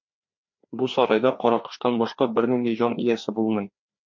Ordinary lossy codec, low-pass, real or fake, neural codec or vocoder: MP3, 48 kbps; 7.2 kHz; fake; codec, 16 kHz, 2 kbps, FreqCodec, larger model